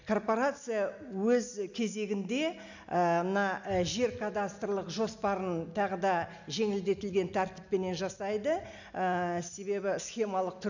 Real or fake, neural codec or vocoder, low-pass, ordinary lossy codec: real; none; 7.2 kHz; none